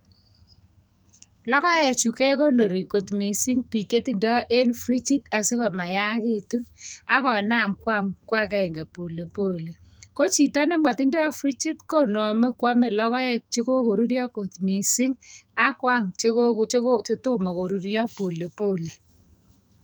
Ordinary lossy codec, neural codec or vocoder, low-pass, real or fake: none; codec, 44.1 kHz, 2.6 kbps, SNAC; none; fake